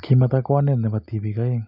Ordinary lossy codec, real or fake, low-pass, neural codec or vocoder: none; real; 5.4 kHz; none